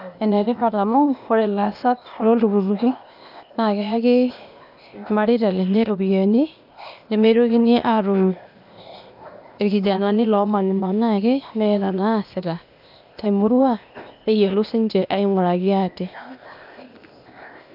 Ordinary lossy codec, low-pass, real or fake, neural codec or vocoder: none; 5.4 kHz; fake; codec, 16 kHz, 0.8 kbps, ZipCodec